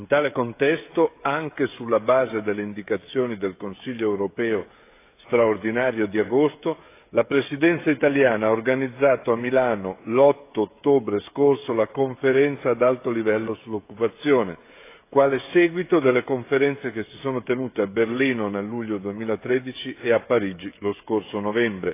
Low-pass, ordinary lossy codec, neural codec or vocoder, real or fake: 3.6 kHz; AAC, 24 kbps; codec, 16 kHz, 16 kbps, FreqCodec, smaller model; fake